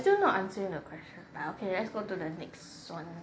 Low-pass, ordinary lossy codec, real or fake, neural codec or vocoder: none; none; real; none